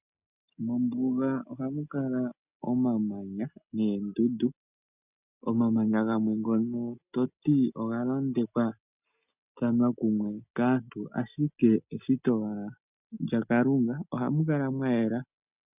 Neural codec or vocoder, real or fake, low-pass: none; real; 3.6 kHz